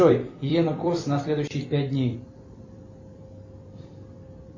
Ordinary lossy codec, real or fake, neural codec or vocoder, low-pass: MP3, 32 kbps; fake; vocoder, 44.1 kHz, 128 mel bands every 256 samples, BigVGAN v2; 7.2 kHz